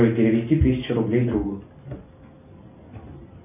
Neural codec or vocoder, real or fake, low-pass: none; real; 3.6 kHz